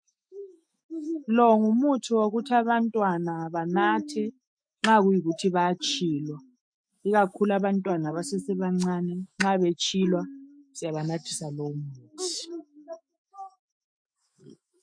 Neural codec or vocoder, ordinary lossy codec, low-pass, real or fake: autoencoder, 48 kHz, 128 numbers a frame, DAC-VAE, trained on Japanese speech; MP3, 48 kbps; 9.9 kHz; fake